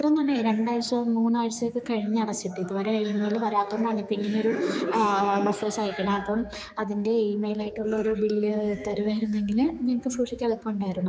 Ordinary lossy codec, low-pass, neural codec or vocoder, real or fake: none; none; codec, 16 kHz, 4 kbps, X-Codec, HuBERT features, trained on general audio; fake